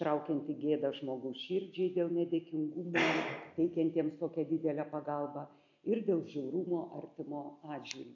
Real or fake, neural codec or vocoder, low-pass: real; none; 7.2 kHz